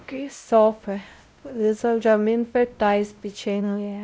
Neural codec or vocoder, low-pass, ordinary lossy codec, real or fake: codec, 16 kHz, 0.5 kbps, X-Codec, WavLM features, trained on Multilingual LibriSpeech; none; none; fake